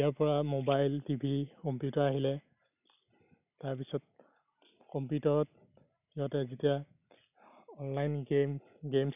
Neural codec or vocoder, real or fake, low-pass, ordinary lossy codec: none; real; 3.6 kHz; none